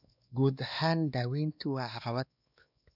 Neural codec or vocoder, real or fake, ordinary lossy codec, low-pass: codec, 16 kHz, 2 kbps, X-Codec, WavLM features, trained on Multilingual LibriSpeech; fake; none; 5.4 kHz